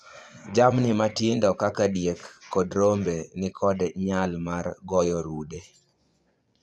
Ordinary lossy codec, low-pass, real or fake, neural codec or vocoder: none; none; fake; vocoder, 24 kHz, 100 mel bands, Vocos